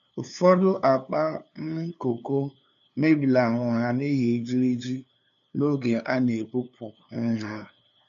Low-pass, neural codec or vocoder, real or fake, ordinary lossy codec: 7.2 kHz; codec, 16 kHz, 2 kbps, FunCodec, trained on LibriTTS, 25 frames a second; fake; none